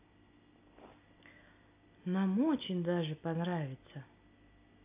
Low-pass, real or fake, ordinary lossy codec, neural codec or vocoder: 3.6 kHz; real; AAC, 32 kbps; none